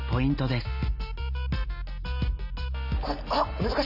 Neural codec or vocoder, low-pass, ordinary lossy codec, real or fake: none; 5.4 kHz; none; real